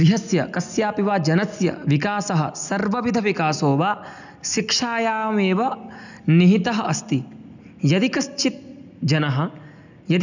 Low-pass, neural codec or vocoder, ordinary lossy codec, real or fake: 7.2 kHz; none; none; real